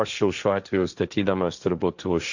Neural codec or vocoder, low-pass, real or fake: codec, 16 kHz, 1.1 kbps, Voila-Tokenizer; 7.2 kHz; fake